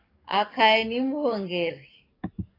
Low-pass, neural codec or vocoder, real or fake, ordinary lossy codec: 5.4 kHz; codec, 44.1 kHz, 7.8 kbps, DAC; fake; AAC, 24 kbps